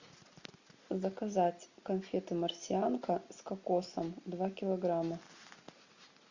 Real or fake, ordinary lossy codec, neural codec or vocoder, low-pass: real; Opus, 64 kbps; none; 7.2 kHz